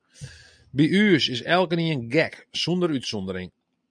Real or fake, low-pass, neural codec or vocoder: real; 9.9 kHz; none